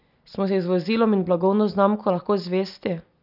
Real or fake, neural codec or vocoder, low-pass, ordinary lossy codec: real; none; 5.4 kHz; none